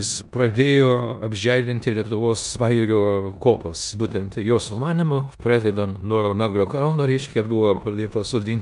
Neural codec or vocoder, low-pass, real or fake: codec, 16 kHz in and 24 kHz out, 0.9 kbps, LongCat-Audio-Codec, four codebook decoder; 10.8 kHz; fake